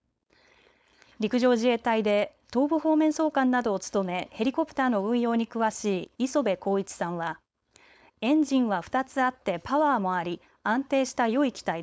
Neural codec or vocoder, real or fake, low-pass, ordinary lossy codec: codec, 16 kHz, 4.8 kbps, FACodec; fake; none; none